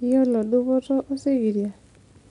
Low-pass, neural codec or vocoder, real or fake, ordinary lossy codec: 10.8 kHz; none; real; none